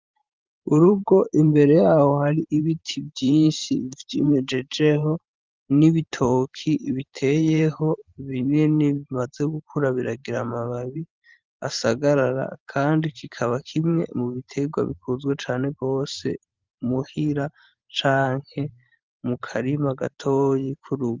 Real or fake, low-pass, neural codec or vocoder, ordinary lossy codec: fake; 7.2 kHz; vocoder, 44.1 kHz, 128 mel bands every 512 samples, BigVGAN v2; Opus, 24 kbps